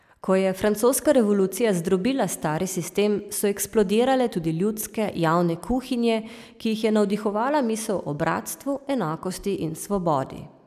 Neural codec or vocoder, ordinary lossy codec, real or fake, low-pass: none; none; real; 14.4 kHz